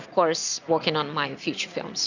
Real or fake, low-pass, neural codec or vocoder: real; 7.2 kHz; none